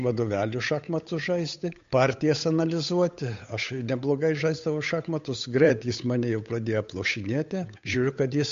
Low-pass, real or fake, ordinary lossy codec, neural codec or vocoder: 7.2 kHz; fake; MP3, 48 kbps; codec, 16 kHz, 8 kbps, FunCodec, trained on Chinese and English, 25 frames a second